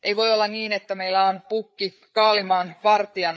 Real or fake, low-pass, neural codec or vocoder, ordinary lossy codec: fake; none; codec, 16 kHz, 4 kbps, FreqCodec, larger model; none